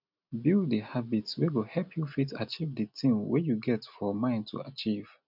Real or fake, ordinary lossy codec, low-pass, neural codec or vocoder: real; none; 5.4 kHz; none